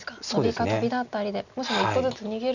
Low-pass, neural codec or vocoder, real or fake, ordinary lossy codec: 7.2 kHz; none; real; none